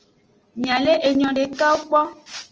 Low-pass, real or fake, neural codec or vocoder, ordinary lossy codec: 7.2 kHz; real; none; Opus, 24 kbps